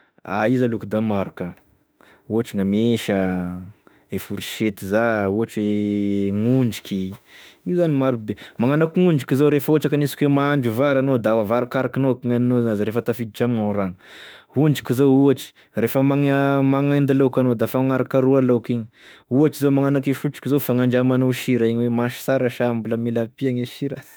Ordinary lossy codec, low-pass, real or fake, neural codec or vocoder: none; none; fake; autoencoder, 48 kHz, 32 numbers a frame, DAC-VAE, trained on Japanese speech